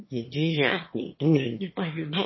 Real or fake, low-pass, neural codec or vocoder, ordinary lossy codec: fake; 7.2 kHz; autoencoder, 22.05 kHz, a latent of 192 numbers a frame, VITS, trained on one speaker; MP3, 24 kbps